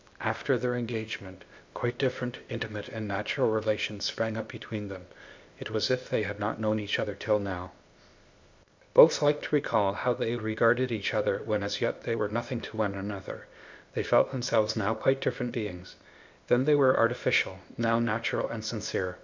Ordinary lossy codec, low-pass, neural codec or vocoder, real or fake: MP3, 64 kbps; 7.2 kHz; codec, 16 kHz, 0.8 kbps, ZipCodec; fake